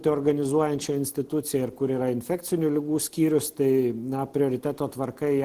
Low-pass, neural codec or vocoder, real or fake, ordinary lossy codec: 14.4 kHz; none; real; Opus, 16 kbps